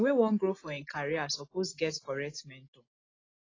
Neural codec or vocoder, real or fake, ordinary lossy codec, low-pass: none; real; AAC, 32 kbps; 7.2 kHz